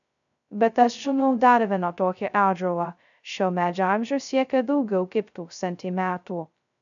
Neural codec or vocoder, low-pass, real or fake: codec, 16 kHz, 0.2 kbps, FocalCodec; 7.2 kHz; fake